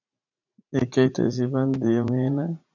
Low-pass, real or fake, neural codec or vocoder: 7.2 kHz; fake; vocoder, 44.1 kHz, 80 mel bands, Vocos